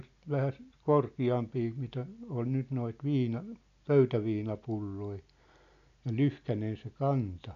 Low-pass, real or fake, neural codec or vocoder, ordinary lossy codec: 7.2 kHz; real; none; none